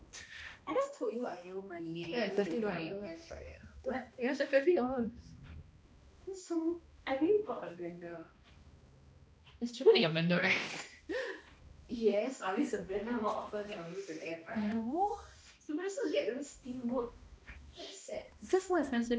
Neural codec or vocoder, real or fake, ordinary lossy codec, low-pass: codec, 16 kHz, 1 kbps, X-Codec, HuBERT features, trained on balanced general audio; fake; none; none